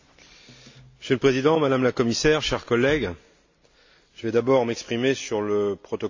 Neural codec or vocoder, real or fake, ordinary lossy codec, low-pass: none; real; MP3, 64 kbps; 7.2 kHz